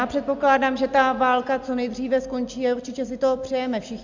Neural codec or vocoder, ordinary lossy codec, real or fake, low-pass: none; MP3, 64 kbps; real; 7.2 kHz